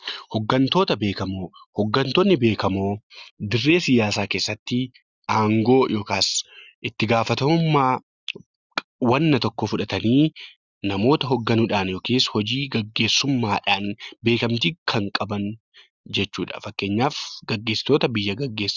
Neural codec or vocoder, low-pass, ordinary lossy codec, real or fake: none; 7.2 kHz; Opus, 64 kbps; real